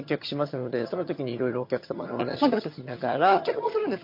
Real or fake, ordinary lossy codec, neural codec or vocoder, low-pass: fake; MP3, 32 kbps; vocoder, 22.05 kHz, 80 mel bands, HiFi-GAN; 5.4 kHz